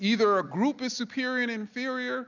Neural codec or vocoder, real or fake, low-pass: none; real; 7.2 kHz